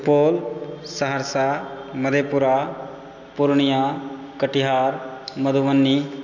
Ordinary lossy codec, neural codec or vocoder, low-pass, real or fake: none; none; 7.2 kHz; real